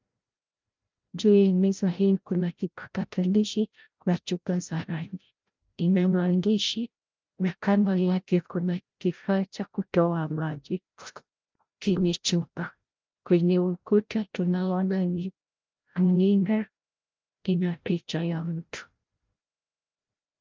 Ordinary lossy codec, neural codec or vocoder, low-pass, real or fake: Opus, 24 kbps; codec, 16 kHz, 0.5 kbps, FreqCodec, larger model; 7.2 kHz; fake